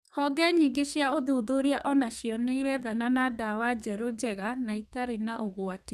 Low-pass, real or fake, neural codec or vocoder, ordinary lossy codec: 14.4 kHz; fake; codec, 32 kHz, 1.9 kbps, SNAC; none